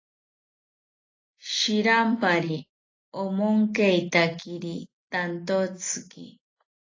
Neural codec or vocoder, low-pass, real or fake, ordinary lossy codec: none; 7.2 kHz; real; AAC, 32 kbps